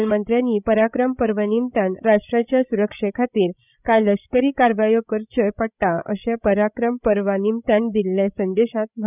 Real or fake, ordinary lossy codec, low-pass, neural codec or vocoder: fake; none; 3.6 kHz; codec, 16 kHz, 16 kbps, FreqCodec, larger model